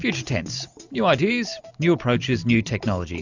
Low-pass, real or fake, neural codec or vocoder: 7.2 kHz; real; none